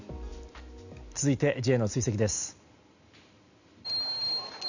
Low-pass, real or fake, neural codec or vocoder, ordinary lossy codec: 7.2 kHz; real; none; none